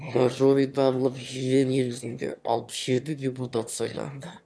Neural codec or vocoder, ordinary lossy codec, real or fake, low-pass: autoencoder, 22.05 kHz, a latent of 192 numbers a frame, VITS, trained on one speaker; none; fake; none